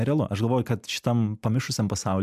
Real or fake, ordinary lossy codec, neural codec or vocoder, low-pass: real; MP3, 96 kbps; none; 14.4 kHz